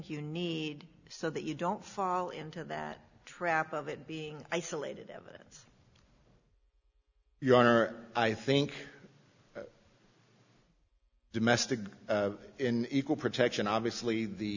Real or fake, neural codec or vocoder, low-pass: real; none; 7.2 kHz